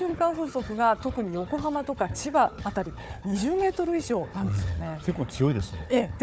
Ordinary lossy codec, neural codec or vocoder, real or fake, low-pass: none; codec, 16 kHz, 4 kbps, FunCodec, trained on Chinese and English, 50 frames a second; fake; none